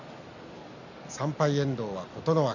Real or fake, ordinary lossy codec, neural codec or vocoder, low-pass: real; none; none; 7.2 kHz